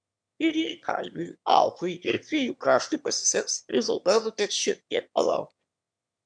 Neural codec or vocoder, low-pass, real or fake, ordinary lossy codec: autoencoder, 22.05 kHz, a latent of 192 numbers a frame, VITS, trained on one speaker; 9.9 kHz; fake; MP3, 96 kbps